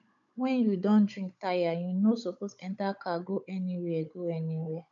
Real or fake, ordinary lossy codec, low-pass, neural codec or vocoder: fake; none; 7.2 kHz; codec, 16 kHz, 16 kbps, FunCodec, trained on Chinese and English, 50 frames a second